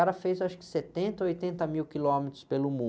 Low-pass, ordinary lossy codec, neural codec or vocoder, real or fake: none; none; none; real